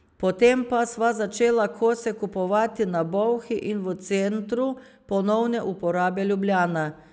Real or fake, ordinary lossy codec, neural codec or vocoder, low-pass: real; none; none; none